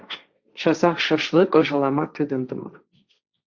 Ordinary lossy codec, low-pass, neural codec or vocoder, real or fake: Opus, 64 kbps; 7.2 kHz; codec, 16 kHz, 1.1 kbps, Voila-Tokenizer; fake